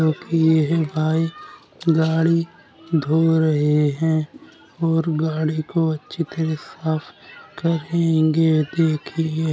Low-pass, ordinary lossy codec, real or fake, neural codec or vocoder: none; none; real; none